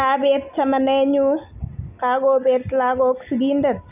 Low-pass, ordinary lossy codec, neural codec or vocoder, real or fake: 3.6 kHz; none; none; real